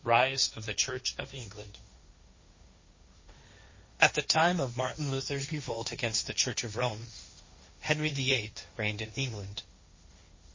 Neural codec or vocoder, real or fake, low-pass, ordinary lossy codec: codec, 16 kHz, 1.1 kbps, Voila-Tokenizer; fake; 7.2 kHz; MP3, 32 kbps